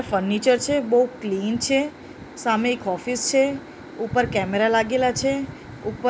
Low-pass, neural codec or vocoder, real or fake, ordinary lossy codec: none; none; real; none